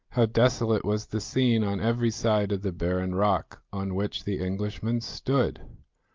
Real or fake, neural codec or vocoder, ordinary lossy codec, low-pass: real; none; Opus, 32 kbps; 7.2 kHz